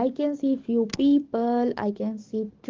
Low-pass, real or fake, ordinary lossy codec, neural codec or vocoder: 7.2 kHz; fake; Opus, 16 kbps; codec, 44.1 kHz, 7.8 kbps, DAC